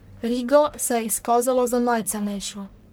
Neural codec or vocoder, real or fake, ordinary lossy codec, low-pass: codec, 44.1 kHz, 1.7 kbps, Pupu-Codec; fake; none; none